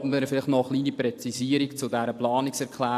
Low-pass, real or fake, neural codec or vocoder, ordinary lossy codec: 14.4 kHz; real; none; AAC, 64 kbps